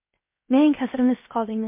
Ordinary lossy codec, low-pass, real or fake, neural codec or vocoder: MP3, 24 kbps; 3.6 kHz; fake; codec, 16 kHz, 0.7 kbps, FocalCodec